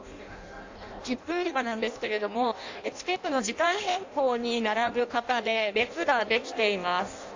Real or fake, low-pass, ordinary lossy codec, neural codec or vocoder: fake; 7.2 kHz; AAC, 48 kbps; codec, 16 kHz in and 24 kHz out, 0.6 kbps, FireRedTTS-2 codec